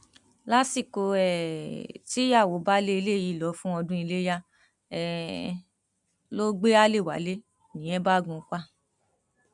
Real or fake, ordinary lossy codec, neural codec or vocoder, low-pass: real; none; none; 10.8 kHz